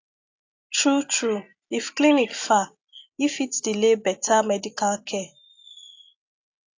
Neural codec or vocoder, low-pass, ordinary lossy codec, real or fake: none; 7.2 kHz; AAC, 48 kbps; real